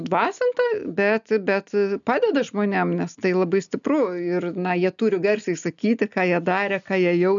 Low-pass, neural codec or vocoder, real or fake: 7.2 kHz; none; real